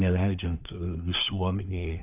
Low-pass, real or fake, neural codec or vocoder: 3.6 kHz; fake; codec, 24 kHz, 1 kbps, SNAC